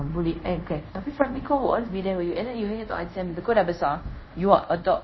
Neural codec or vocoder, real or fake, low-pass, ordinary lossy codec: codec, 24 kHz, 0.5 kbps, DualCodec; fake; 7.2 kHz; MP3, 24 kbps